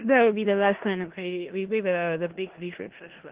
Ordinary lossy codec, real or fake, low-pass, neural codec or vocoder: Opus, 16 kbps; fake; 3.6 kHz; codec, 16 kHz in and 24 kHz out, 0.4 kbps, LongCat-Audio-Codec, four codebook decoder